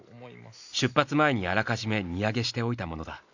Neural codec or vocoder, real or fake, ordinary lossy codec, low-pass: none; real; none; 7.2 kHz